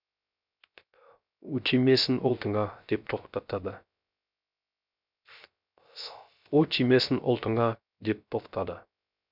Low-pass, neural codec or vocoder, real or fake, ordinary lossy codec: 5.4 kHz; codec, 16 kHz, 0.3 kbps, FocalCodec; fake; none